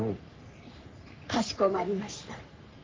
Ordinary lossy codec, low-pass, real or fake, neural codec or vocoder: Opus, 24 kbps; 7.2 kHz; fake; codec, 44.1 kHz, 3.4 kbps, Pupu-Codec